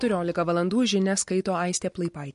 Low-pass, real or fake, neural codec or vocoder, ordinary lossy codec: 14.4 kHz; real; none; MP3, 48 kbps